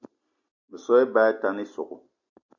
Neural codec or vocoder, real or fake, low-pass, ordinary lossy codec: none; real; 7.2 kHz; MP3, 64 kbps